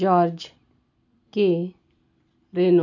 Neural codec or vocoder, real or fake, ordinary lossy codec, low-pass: none; real; none; 7.2 kHz